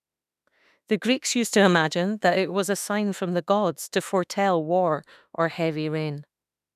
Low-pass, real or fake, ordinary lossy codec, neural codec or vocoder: 14.4 kHz; fake; none; autoencoder, 48 kHz, 32 numbers a frame, DAC-VAE, trained on Japanese speech